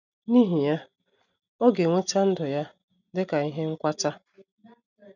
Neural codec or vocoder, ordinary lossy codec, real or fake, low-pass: none; none; real; 7.2 kHz